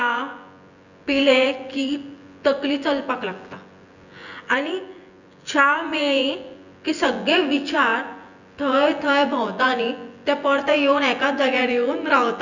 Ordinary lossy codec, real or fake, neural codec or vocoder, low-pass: none; fake; vocoder, 24 kHz, 100 mel bands, Vocos; 7.2 kHz